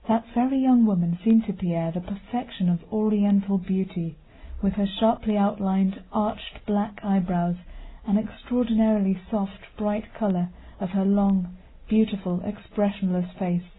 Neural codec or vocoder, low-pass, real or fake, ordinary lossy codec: none; 7.2 kHz; real; AAC, 16 kbps